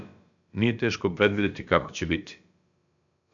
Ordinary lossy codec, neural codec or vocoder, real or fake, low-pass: AAC, 64 kbps; codec, 16 kHz, about 1 kbps, DyCAST, with the encoder's durations; fake; 7.2 kHz